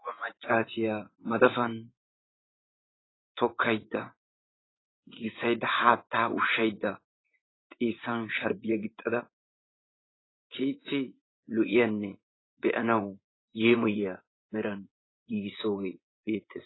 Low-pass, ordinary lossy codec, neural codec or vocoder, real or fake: 7.2 kHz; AAC, 16 kbps; vocoder, 24 kHz, 100 mel bands, Vocos; fake